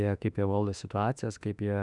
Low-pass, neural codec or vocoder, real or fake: 10.8 kHz; autoencoder, 48 kHz, 32 numbers a frame, DAC-VAE, trained on Japanese speech; fake